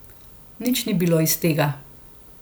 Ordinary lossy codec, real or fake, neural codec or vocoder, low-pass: none; real; none; none